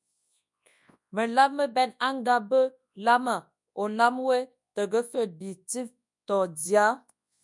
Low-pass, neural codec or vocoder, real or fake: 10.8 kHz; codec, 24 kHz, 0.9 kbps, WavTokenizer, large speech release; fake